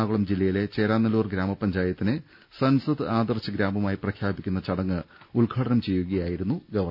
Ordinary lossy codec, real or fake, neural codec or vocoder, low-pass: none; real; none; 5.4 kHz